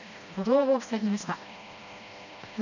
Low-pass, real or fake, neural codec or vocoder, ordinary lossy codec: 7.2 kHz; fake; codec, 16 kHz, 1 kbps, FreqCodec, smaller model; none